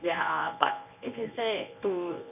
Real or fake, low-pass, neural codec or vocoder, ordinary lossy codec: fake; 3.6 kHz; codec, 24 kHz, 0.9 kbps, WavTokenizer, medium speech release version 1; none